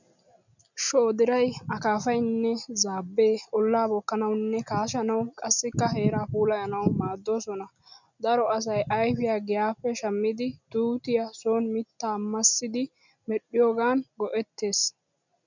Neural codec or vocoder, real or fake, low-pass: none; real; 7.2 kHz